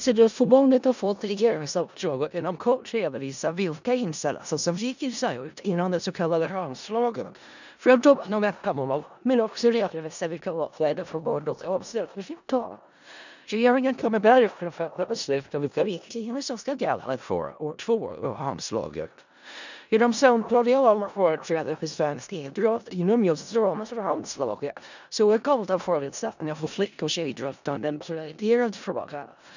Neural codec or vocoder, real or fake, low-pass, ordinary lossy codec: codec, 16 kHz in and 24 kHz out, 0.4 kbps, LongCat-Audio-Codec, four codebook decoder; fake; 7.2 kHz; none